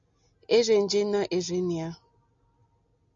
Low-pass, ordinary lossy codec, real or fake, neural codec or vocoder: 7.2 kHz; AAC, 64 kbps; real; none